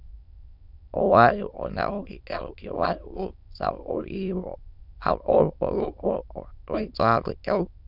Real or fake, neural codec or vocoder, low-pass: fake; autoencoder, 22.05 kHz, a latent of 192 numbers a frame, VITS, trained on many speakers; 5.4 kHz